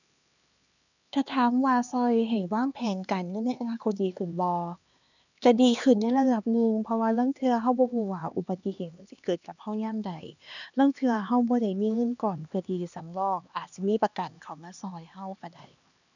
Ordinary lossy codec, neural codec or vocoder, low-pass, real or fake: none; codec, 16 kHz, 2 kbps, X-Codec, HuBERT features, trained on LibriSpeech; 7.2 kHz; fake